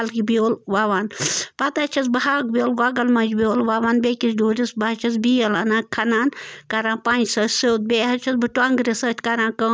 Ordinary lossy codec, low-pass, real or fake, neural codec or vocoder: none; none; real; none